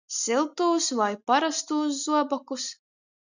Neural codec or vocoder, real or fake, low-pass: none; real; 7.2 kHz